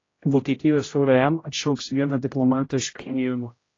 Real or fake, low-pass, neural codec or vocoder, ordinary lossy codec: fake; 7.2 kHz; codec, 16 kHz, 0.5 kbps, X-Codec, HuBERT features, trained on general audio; AAC, 32 kbps